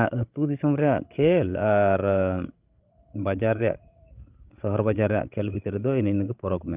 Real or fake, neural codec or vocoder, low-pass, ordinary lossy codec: fake; codec, 16 kHz, 16 kbps, FreqCodec, larger model; 3.6 kHz; Opus, 24 kbps